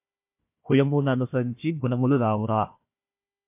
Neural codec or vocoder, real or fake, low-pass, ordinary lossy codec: codec, 16 kHz, 1 kbps, FunCodec, trained on Chinese and English, 50 frames a second; fake; 3.6 kHz; MP3, 32 kbps